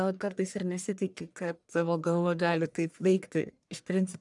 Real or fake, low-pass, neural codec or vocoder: fake; 10.8 kHz; codec, 44.1 kHz, 1.7 kbps, Pupu-Codec